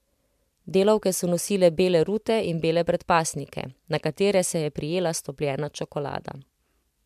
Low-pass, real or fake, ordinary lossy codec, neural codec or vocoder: 14.4 kHz; real; MP3, 96 kbps; none